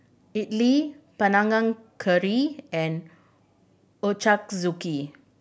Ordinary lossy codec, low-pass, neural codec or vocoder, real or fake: none; none; none; real